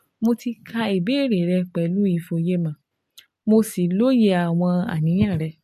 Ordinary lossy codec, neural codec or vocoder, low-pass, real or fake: MP3, 64 kbps; none; 14.4 kHz; real